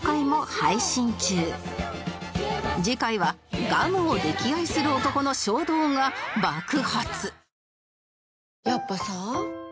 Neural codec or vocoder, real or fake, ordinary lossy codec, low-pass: none; real; none; none